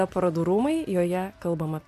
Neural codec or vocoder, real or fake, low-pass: none; real; 14.4 kHz